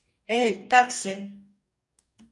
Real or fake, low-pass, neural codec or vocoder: fake; 10.8 kHz; codec, 44.1 kHz, 2.6 kbps, DAC